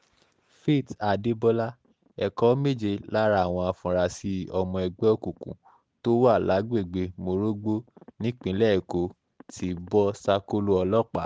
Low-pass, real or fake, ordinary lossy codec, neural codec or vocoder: none; real; none; none